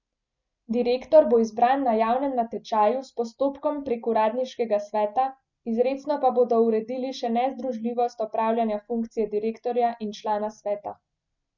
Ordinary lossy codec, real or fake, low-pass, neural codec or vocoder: none; real; 7.2 kHz; none